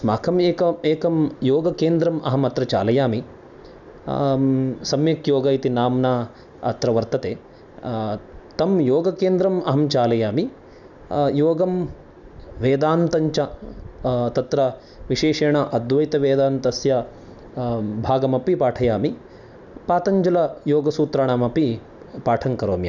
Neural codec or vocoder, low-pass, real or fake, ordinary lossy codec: none; 7.2 kHz; real; none